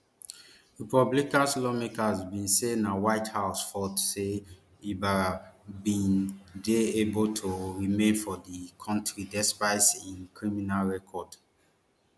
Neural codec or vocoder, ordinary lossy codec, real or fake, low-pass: none; none; real; none